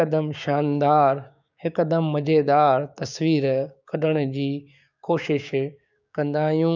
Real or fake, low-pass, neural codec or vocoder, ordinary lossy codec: fake; 7.2 kHz; codec, 16 kHz, 6 kbps, DAC; none